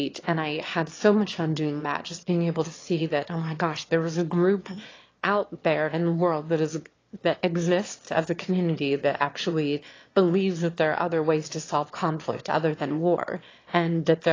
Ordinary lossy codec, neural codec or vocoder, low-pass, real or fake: AAC, 32 kbps; autoencoder, 22.05 kHz, a latent of 192 numbers a frame, VITS, trained on one speaker; 7.2 kHz; fake